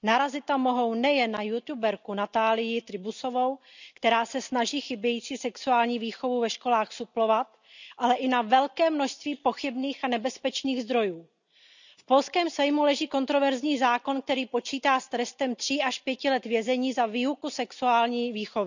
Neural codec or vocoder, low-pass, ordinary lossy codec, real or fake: none; 7.2 kHz; none; real